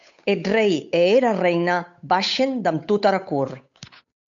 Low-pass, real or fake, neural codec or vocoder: 7.2 kHz; fake; codec, 16 kHz, 8 kbps, FunCodec, trained on Chinese and English, 25 frames a second